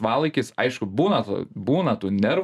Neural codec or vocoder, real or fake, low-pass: none; real; 14.4 kHz